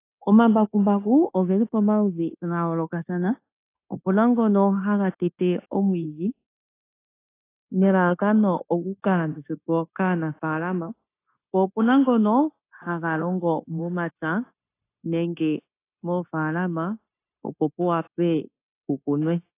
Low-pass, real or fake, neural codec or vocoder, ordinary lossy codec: 3.6 kHz; fake; codec, 16 kHz, 0.9 kbps, LongCat-Audio-Codec; AAC, 24 kbps